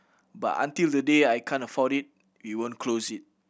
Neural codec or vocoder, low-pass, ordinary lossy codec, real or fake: none; none; none; real